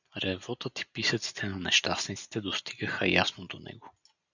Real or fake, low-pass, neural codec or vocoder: real; 7.2 kHz; none